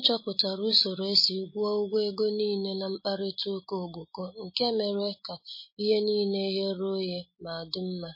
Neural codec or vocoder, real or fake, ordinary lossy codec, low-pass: none; real; MP3, 24 kbps; 5.4 kHz